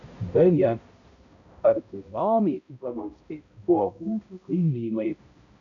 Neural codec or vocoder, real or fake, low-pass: codec, 16 kHz, 0.5 kbps, X-Codec, HuBERT features, trained on balanced general audio; fake; 7.2 kHz